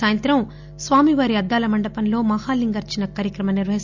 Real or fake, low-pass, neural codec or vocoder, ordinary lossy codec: real; 7.2 kHz; none; Opus, 64 kbps